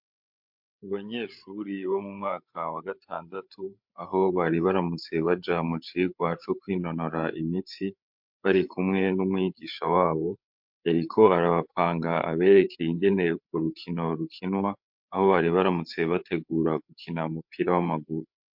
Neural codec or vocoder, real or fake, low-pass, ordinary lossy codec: codec, 16 kHz, 8 kbps, FreqCodec, larger model; fake; 5.4 kHz; MP3, 48 kbps